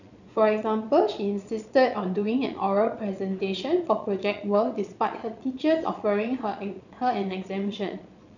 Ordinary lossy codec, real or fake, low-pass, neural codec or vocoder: none; fake; 7.2 kHz; vocoder, 22.05 kHz, 80 mel bands, Vocos